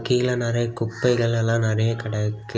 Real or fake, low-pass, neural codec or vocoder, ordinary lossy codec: real; none; none; none